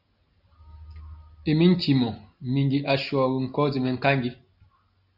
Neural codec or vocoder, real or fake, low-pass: none; real; 5.4 kHz